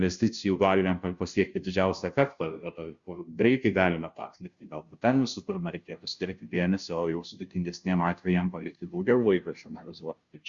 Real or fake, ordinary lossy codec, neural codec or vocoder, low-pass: fake; Opus, 64 kbps; codec, 16 kHz, 0.5 kbps, FunCodec, trained on Chinese and English, 25 frames a second; 7.2 kHz